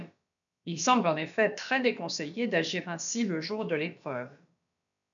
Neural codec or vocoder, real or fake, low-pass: codec, 16 kHz, about 1 kbps, DyCAST, with the encoder's durations; fake; 7.2 kHz